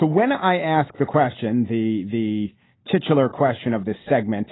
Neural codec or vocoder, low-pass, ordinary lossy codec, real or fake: none; 7.2 kHz; AAC, 16 kbps; real